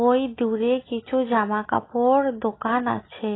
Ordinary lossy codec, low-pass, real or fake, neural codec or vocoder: AAC, 16 kbps; 7.2 kHz; real; none